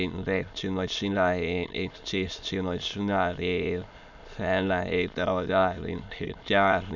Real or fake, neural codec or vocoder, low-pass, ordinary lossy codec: fake; autoencoder, 22.05 kHz, a latent of 192 numbers a frame, VITS, trained on many speakers; 7.2 kHz; none